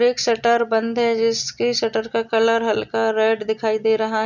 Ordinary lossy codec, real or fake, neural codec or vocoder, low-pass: none; real; none; 7.2 kHz